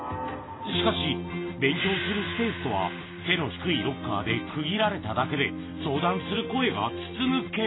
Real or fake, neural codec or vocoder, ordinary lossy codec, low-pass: real; none; AAC, 16 kbps; 7.2 kHz